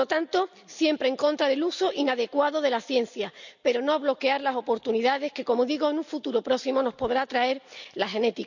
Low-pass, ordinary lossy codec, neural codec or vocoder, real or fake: 7.2 kHz; none; none; real